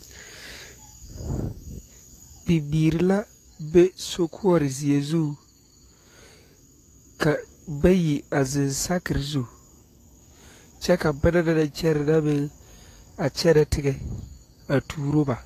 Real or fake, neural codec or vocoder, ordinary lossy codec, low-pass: fake; codec, 44.1 kHz, 7.8 kbps, DAC; AAC, 48 kbps; 14.4 kHz